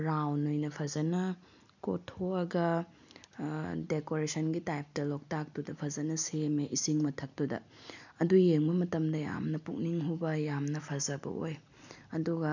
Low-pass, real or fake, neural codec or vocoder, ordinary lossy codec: 7.2 kHz; real; none; none